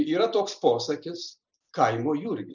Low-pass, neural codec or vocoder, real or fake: 7.2 kHz; none; real